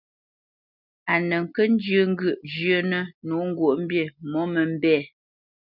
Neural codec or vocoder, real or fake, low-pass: none; real; 5.4 kHz